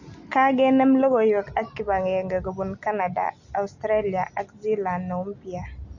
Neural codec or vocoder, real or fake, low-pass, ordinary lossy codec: none; real; 7.2 kHz; none